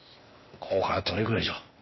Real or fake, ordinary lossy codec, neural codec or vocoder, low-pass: fake; MP3, 24 kbps; codec, 16 kHz, 0.8 kbps, ZipCodec; 7.2 kHz